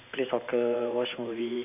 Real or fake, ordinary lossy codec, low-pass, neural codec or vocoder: fake; none; 3.6 kHz; vocoder, 44.1 kHz, 128 mel bands every 512 samples, BigVGAN v2